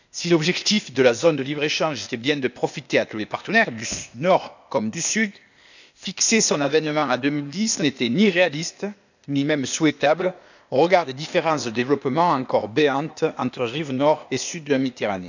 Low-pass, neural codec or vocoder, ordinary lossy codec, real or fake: 7.2 kHz; codec, 16 kHz, 0.8 kbps, ZipCodec; none; fake